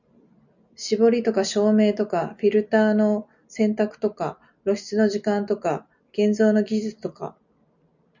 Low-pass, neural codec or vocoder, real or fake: 7.2 kHz; none; real